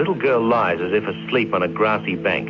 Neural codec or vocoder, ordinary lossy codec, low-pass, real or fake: none; MP3, 64 kbps; 7.2 kHz; real